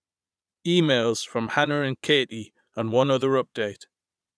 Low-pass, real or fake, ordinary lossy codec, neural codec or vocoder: none; fake; none; vocoder, 22.05 kHz, 80 mel bands, Vocos